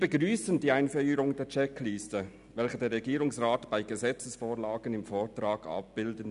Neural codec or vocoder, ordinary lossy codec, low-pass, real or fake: none; none; 10.8 kHz; real